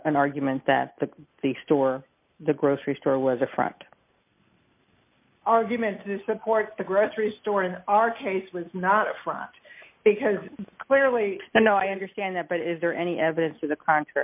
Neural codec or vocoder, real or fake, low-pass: none; real; 3.6 kHz